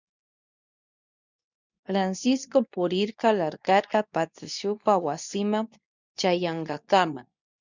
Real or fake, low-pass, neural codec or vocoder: fake; 7.2 kHz; codec, 24 kHz, 0.9 kbps, WavTokenizer, medium speech release version 2